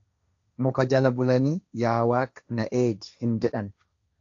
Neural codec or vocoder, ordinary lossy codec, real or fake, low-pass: codec, 16 kHz, 1.1 kbps, Voila-Tokenizer; AAC, 48 kbps; fake; 7.2 kHz